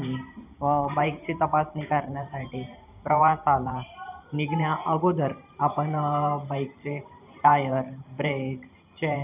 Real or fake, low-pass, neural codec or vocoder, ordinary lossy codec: fake; 3.6 kHz; vocoder, 44.1 kHz, 128 mel bands every 512 samples, BigVGAN v2; none